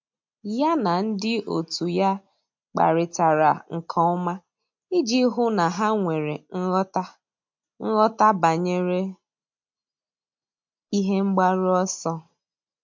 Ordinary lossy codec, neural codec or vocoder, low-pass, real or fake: MP3, 48 kbps; none; 7.2 kHz; real